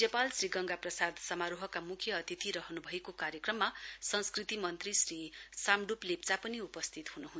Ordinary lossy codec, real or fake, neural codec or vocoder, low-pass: none; real; none; none